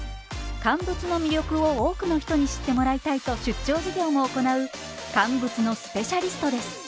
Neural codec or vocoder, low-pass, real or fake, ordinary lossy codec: none; none; real; none